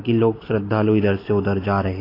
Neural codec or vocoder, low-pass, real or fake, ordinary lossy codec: none; 5.4 kHz; real; AAC, 24 kbps